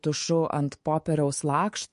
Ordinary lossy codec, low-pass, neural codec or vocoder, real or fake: MP3, 48 kbps; 10.8 kHz; codec, 24 kHz, 3.1 kbps, DualCodec; fake